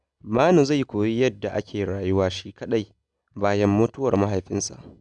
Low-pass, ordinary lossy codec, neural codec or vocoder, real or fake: 9.9 kHz; none; none; real